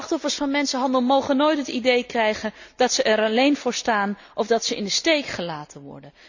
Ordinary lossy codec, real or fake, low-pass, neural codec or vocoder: none; real; 7.2 kHz; none